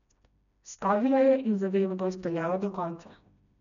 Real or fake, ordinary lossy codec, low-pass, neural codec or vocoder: fake; MP3, 96 kbps; 7.2 kHz; codec, 16 kHz, 1 kbps, FreqCodec, smaller model